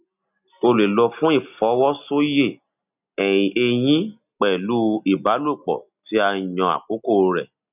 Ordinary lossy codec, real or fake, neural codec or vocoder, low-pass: none; real; none; 3.6 kHz